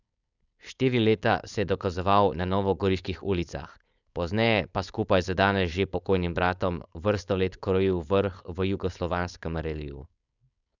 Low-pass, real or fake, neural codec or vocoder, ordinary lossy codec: 7.2 kHz; fake; codec, 16 kHz, 4.8 kbps, FACodec; none